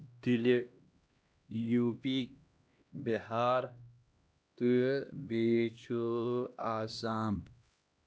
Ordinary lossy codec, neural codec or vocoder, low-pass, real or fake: none; codec, 16 kHz, 1 kbps, X-Codec, HuBERT features, trained on LibriSpeech; none; fake